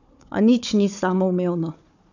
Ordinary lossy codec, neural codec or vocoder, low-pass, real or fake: none; codec, 16 kHz, 4 kbps, FunCodec, trained on Chinese and English, 50 frames a second; 7.2 kHz; fake